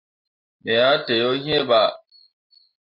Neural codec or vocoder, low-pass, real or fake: none; 5.4 kHz; real